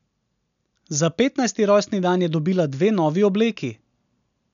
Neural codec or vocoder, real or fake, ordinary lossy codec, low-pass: none; real; none; 7.2 kHz